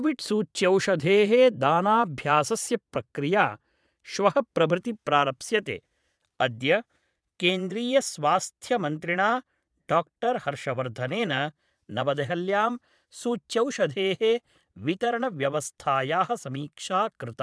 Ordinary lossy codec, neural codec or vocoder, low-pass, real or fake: none; vocoder, 22.05 kHz, 80 mel bands, Vocos; none; fake